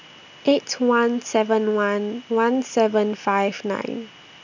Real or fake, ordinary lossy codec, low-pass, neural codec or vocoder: real; none; 7.2 kHz; none